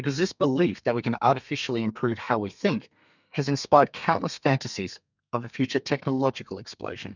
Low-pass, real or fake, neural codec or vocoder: 7.2 kHz; fake; codec, 32 kHz, 1.9 kbps, SNAC